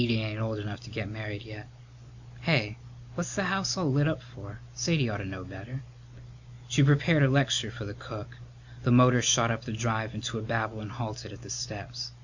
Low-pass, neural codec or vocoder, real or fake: 7.2 kHz; none; real